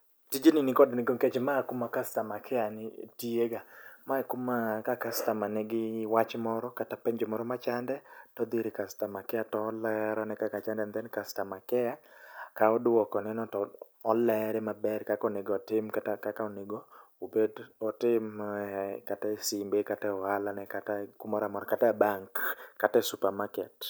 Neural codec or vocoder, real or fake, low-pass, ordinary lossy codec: none; real; none; none